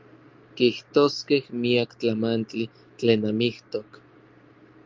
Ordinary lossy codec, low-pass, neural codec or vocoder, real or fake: Opus, 32 kbps; 7.2 kHz; none; real